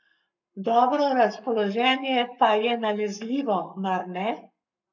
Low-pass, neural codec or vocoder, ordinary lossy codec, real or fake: 7.2 kHz; codec, 44.1 kHz, 7.8 kbps, Pupu-Codec; none; fake